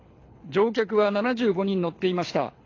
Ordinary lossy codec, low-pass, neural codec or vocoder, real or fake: AAC, 32 kbps; 7.2 kHz; codec, 24 kHz, 6 kbps, HILCodec; fake